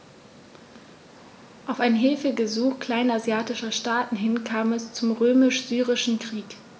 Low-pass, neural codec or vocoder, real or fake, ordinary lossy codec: none; none; real; none